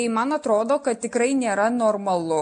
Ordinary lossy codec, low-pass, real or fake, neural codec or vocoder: MP3, 48 kbps; 9.9 kHz; real; none